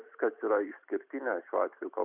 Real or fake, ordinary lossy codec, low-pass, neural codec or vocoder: real; Opus, 64 kbps; 3.6 kHz; none